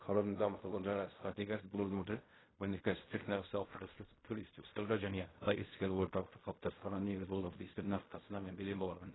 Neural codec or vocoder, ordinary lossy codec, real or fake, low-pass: codec, 16 kHz in and 24 kHz out, 0.4 kbps, LongCat-Audio-Codec, fine tuned four codebook decoder; AAC, 16 kbps; fake; 7.2 kHz